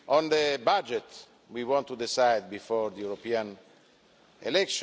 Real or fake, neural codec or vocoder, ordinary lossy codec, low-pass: real; none; none; none